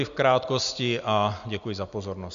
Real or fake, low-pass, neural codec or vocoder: real; 7.2 kHz; none